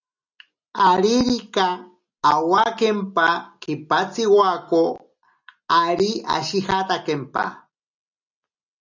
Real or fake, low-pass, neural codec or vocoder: real; 7.2 kHz; none